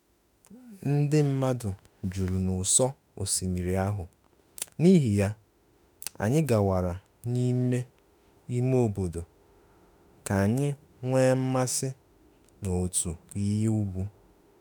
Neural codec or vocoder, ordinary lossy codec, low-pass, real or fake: autoencoder, 48 kHz, 32 numbers a frame, DAC-VAE, trained on Japanese speech; none; none; fake